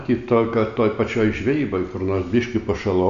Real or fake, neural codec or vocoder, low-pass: real; none; 7.2 kHz